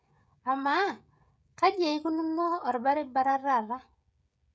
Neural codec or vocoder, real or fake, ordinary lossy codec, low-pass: codec, 16 kHz, 16 kbps, FreqCodec, smaller model; fake; none; none